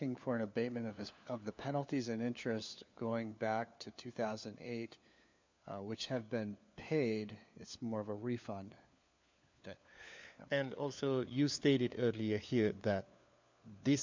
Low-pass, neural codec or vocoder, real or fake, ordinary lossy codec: 7.2 kHz; codec, 16 kHz, 4 kbps, FunCodec, trained on LibriTTS, 50 frames a second; fake; MP3, 64 kbps